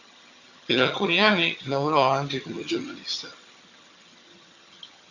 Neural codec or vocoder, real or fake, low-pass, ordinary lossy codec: vocoder, 22.05 kHz, 80 mel bands, HiFi-GAN; fake; 7.2 kHz; Opus, 64 kbps